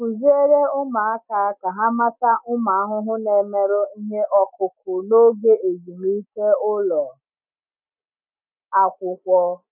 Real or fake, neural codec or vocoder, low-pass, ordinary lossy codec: real; none; 3.6 kHz; none